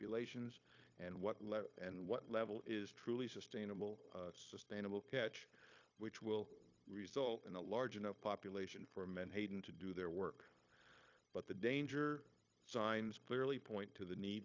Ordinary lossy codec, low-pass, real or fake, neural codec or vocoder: AAC, 48 kbps; 7.2 kHz; fake; codec, 16 kHz, 0.9 kbps, LongCat-Audio-Codec